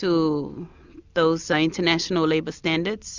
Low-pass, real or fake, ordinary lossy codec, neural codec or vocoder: 7.2 kHz; real; Opus, 64 kbps; none